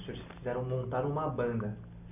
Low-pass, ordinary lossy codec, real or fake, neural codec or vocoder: 3.6 kHz; none; real; none